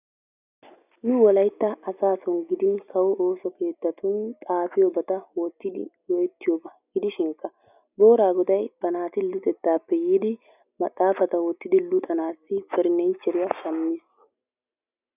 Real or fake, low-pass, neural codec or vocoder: real; 3.6 kHz; none